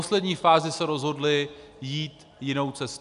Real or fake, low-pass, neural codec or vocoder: real; 10.8 kHz; none